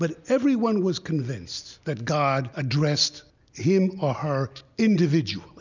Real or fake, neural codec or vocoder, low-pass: real; none; 7.2 kHz